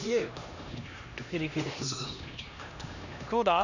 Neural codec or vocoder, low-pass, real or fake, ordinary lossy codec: codec, 16 kHz, 1 kbps, X-Codec, HuBERT features, trained on LibriSpeech; 7.2 kHz; fake; none